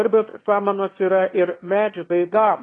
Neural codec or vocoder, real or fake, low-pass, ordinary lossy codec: autoencoder, 22.05 kHz, a latent of 192 numbers a frame, VITS, trained on one speaker; fake; 9.9 kHz; AAC, 32 kbps